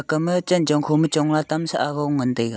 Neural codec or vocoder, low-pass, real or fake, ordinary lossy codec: none; none; real; none